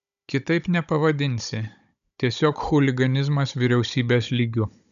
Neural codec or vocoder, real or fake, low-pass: codec, 16 kHz, 16 kbps, FunCodec, trained on Chinese and English, 50 frames a second; fake; 7.2 kHz